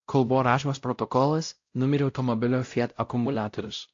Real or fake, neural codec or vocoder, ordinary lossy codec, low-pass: fake; codec, 16 kHz, 0.5 kbps, X-Codec, WavLM features, trained on Multilingual LibriSpeech; AAC, 48 kbps; 7.2 kHz